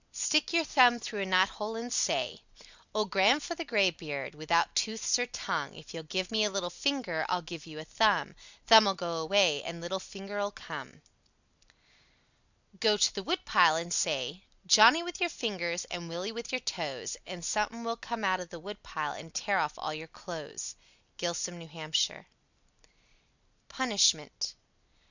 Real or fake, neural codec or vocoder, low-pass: real; none; 7.2 kHz